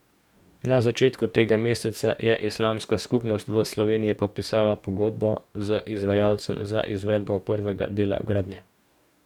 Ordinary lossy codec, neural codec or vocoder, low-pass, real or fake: none; codec, 44.1 kHz, 2.6 kbps, DAC; 19.8 kHz; fake